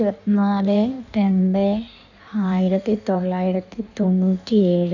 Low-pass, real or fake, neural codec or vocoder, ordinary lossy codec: 7.2 kHz; fake; codec, 16 kHz in and 24 kHz out, 1.1 kbps, FireRedTTS-2 codec; none